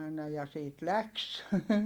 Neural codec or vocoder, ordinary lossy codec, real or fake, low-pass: none; Opus, 32 kbps; real; 19.8 kHz